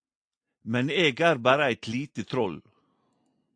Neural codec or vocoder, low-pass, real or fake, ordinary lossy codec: none; 9.9 kHz; real; AAC, 64 kbps